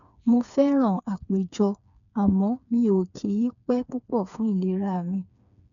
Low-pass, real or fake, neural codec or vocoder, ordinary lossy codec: 7.2 kHz; fake; codec, 16 kHz, 4 kbps, FreqCodec, smaller model; none